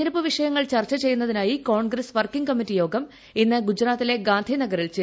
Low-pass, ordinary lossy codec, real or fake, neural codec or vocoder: none; none; real; none